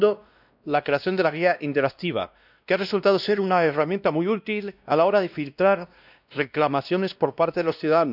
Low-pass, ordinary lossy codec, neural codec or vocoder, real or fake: 5.4 kHz; none; codec, 16 kHz, 1 kbps, X-Codec, WavLM features, trained on Multilingual LibriSpeech; fake